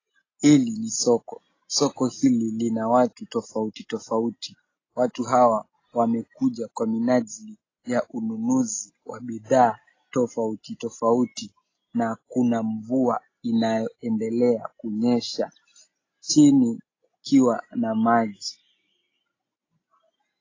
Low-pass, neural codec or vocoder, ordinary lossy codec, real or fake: 7.2 kHz; none; AAC, 32 kbps; real